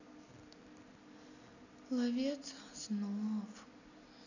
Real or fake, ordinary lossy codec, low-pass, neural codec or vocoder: real; none; 7.2 kHz; none